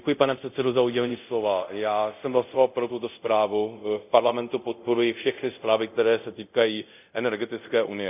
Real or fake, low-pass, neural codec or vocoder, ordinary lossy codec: fake; 3.6 kHz; codec, 24 kHz, 0.5 kbps, DualCodec; none